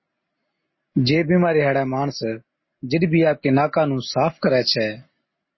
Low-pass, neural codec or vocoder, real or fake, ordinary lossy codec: 7.2 kHz; none; real; MP3, 24 kbps